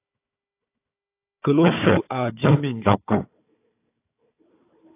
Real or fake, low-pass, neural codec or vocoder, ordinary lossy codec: fake; 3.6 kHz; codec, 16 kHz, 4 kbps, FunCodec, trained on Chinese and English, 50 frames a second; AAC, 24 kbps